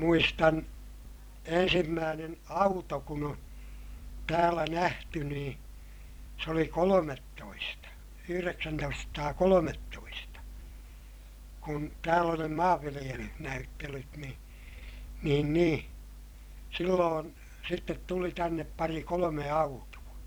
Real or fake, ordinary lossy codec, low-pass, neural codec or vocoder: fake; none; none; vocoder, 44.1 kHz, 128 mel bands every 256 samples, BigVGAN v2